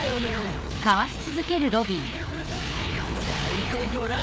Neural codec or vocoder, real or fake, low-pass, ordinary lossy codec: codec, 16 kHz, 4 kbps, FreqCodec, larger model; fake; none; none